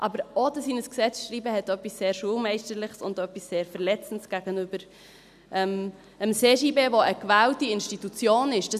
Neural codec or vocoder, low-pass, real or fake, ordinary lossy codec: none; 14.4 kHz; real; none